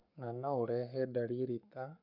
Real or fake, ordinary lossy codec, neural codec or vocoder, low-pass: fake; none; codec, 44.1 kHz, 7.8 kbps, Pupu-Codec; 5.4 kHz